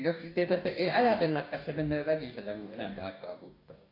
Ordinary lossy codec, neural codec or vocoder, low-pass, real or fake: AAC, 32 kbps; codec, 44.1 kHz, 2.6 kbps, DAC; 5.4 kHz; fake